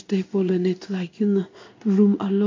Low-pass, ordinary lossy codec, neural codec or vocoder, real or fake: 7.2 kHz; MP3, 48 kbps; codec, 16 kHz in and 24 kHz out, 1 kbps, XY-Tokenizer; fake